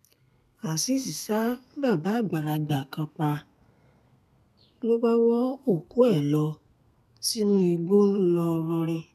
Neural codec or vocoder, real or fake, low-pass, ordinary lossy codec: codec, 32 kHz, 1.9 kbps, SNAC; fake; 14.4 kHz; none